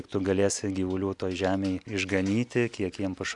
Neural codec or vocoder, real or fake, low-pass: none; real; 10.8 kHz